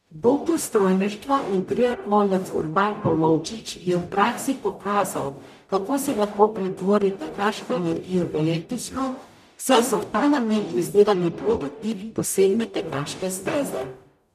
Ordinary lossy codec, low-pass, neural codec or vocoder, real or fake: none; 14.4 kHz; codec, 44.1 kHz, 0.9 kbps, DAC; fake